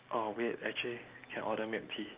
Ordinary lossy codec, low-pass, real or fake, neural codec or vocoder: Opus, 32 kbps; 3.6 kHz; real; none